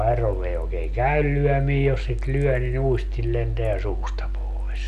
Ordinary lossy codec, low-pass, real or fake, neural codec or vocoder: none; 14.4 kHz; real; none